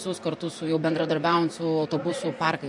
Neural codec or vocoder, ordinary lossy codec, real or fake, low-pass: vocoder, 44.1 kHz, 128 mel bands, Pupu-Vocoder; MP3, 48 kbps; fake; 10.8 kHz